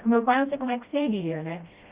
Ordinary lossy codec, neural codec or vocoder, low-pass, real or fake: Opus, 64 kbps; codec, 16 kHz, 1 kbps, FreqCodec, smaller model; 3.6 kHz; fake